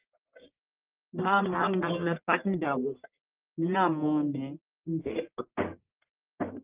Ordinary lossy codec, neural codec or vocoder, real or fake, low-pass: Opus, 24 kbps; codec, 44.1 kHz, 1.7 kbps, Pupu-Codec; fake; 3.6 kHz